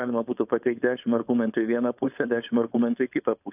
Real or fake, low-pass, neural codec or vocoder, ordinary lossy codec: fake; 3.6 kHz; codec, 16 kHz, 4.8 kbps, FACodec; AAC, 32 kbps